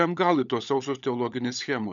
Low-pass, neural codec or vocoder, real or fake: 7.2 kHz; codec, 16 kHz, 16 kbps, FunCodec, trained on LibriTTS, 50 frames a second; fake